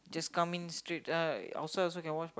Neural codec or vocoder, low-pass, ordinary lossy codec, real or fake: none; none; none; real